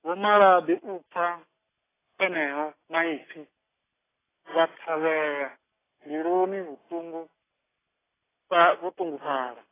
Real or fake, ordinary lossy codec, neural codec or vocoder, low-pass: real; AAC, 16 kbps; none; 3.6 kHz